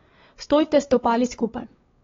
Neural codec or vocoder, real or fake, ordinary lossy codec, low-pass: codec, 16 kHz, 4 kbps, X-Codec, WavLM features, trained on Multilingual LibriSpeech; fake; AAC, 24 kbps; 7.2 kHz